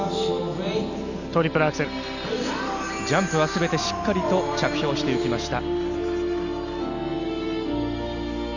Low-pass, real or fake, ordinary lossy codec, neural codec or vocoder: 7.2 kHz; real; none; none